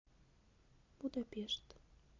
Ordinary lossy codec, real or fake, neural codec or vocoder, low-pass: MP3, 64 kbps; real; none; 7.2 kHz